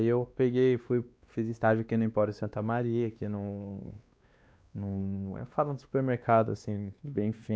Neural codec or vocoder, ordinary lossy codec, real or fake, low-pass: codec, 16 kHz, 2 kbps, X-Codec, WavLM features, trained on Multilingual LibriSpeech; none; fake; none